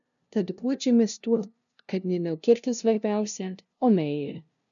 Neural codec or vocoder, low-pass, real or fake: codec, 16 kHz, 0.5 kbps, FunCodec, trained on LibriTTS, 25 frames a second; 7.2 kHz; fake